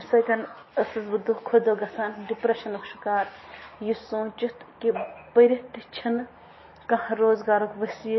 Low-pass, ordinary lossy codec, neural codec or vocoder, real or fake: 7.2 kHz; MP3, 24 kbps; none; real